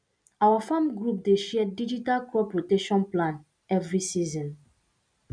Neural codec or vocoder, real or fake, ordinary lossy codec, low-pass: none; real; none; 9.9 kHz